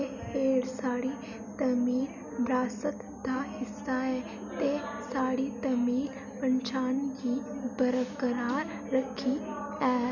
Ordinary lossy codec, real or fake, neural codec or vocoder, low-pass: none; real; none; 7.2 kHz